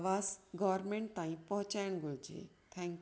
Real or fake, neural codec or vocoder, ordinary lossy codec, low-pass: real; none; none; none